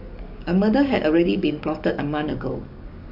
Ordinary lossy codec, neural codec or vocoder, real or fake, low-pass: none; codec, 44.1 kHz, 7.8 kbps, DAC; fake; 5.4 kHz